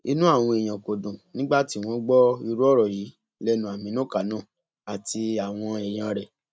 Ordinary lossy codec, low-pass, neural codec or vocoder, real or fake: none; none; none; real